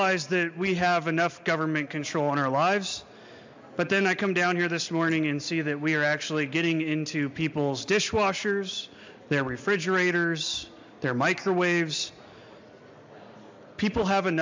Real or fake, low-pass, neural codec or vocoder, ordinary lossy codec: real; 7.2 kHz; none; MP3, 64 kbps